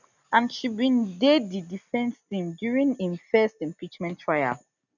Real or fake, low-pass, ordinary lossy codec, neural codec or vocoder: real; 7.2 kHz; none; none